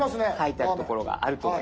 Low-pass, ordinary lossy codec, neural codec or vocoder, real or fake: none; none; none; real